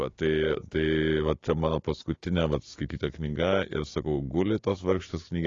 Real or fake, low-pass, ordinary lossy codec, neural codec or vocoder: fake; 7.2 kHz; AAC, 32 kbps; codec, 16 kHz, 6 kbps, DAC